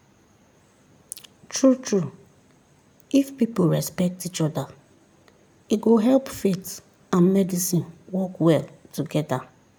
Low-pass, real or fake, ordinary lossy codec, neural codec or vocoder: none; real; none; none